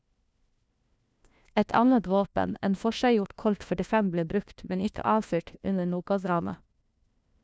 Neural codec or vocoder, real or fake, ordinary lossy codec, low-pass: codec, 16 kHz, 1 kbps, FunCodec, trained on LibriTTS, 50 frames a second; fake; none; none